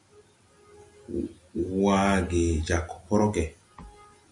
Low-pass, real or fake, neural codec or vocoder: 10.8 kHz; real; none